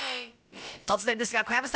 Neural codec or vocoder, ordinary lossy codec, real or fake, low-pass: codec, 16 kHz, about 1 kbps, DyCAST, with the encoder's durations; none; fake; none